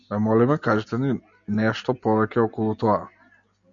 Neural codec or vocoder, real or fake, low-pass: none; real; 7.2 kHz